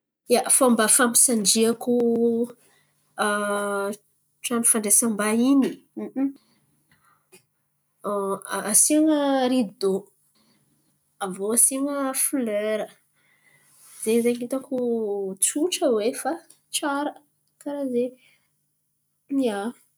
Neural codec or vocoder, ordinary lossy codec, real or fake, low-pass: none; none; real; none